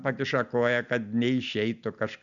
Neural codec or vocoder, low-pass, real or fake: none; 7.2 kHz; real